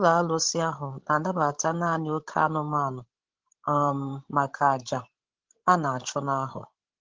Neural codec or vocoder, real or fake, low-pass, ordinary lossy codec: codec, 16 kHz, 16 kbps, FreqCodec, larger model; fake; 7.2 kHz; Opus, 16 kbps